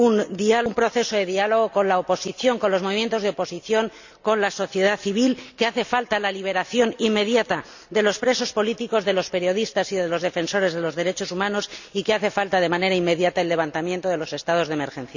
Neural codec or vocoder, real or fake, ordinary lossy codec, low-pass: none; real; none; 7.2 kHz